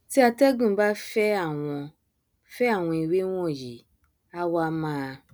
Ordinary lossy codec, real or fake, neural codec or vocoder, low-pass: none; real; none; none